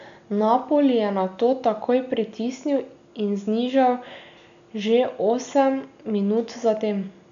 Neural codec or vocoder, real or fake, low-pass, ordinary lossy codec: none; real; 7.2 kHz; none